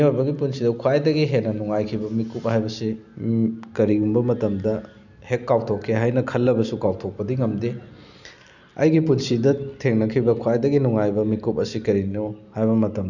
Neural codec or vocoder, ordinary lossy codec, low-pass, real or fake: none; none; 7.2 kHz; real